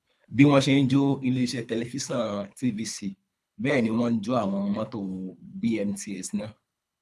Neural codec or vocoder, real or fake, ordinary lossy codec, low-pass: codec, 24 kHz, 3 kbps, HILCodec; fake; none; none